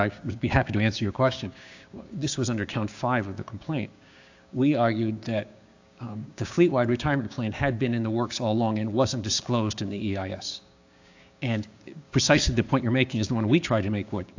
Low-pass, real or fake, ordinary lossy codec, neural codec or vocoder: 7.2 kHz; fake; AAC, 48 kbps; codec, 16 kHz, 6 kbps, DAC